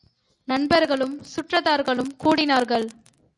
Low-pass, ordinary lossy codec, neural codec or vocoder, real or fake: 10.8 kHz; MP3, 96 kbps; none; real